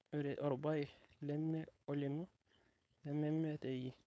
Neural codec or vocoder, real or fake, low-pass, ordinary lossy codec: codec, 16 kHz, 4.8 kbps, FACodec; fake; none; none